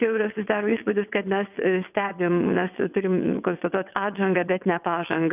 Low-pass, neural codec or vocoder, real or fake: 3.6 kHz; vocoder, 22.05 kHz, 80 mel bands, WaveNeXt; fake